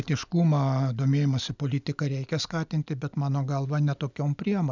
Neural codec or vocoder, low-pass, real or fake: none; 7.2 kHz; real